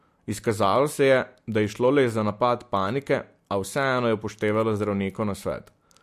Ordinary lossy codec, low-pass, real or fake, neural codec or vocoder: MP3, 64 kbps; 14.4 kHz; real; none